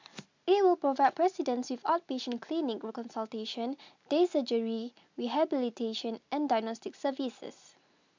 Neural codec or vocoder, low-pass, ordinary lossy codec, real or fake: none; 7.2 kHz; MP3, 64 kbps; real